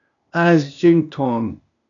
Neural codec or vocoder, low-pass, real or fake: codec, 16 kHz, 0.8 kbps, ZipCodec; 7.2 kHz; fake